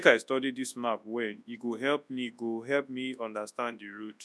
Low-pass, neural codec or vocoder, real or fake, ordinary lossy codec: none; codec, 24 kHz, 0.9 kbps, WavTokenizer, large speech release; fake; none